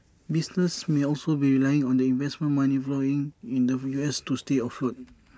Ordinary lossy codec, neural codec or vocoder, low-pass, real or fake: none; none; none; real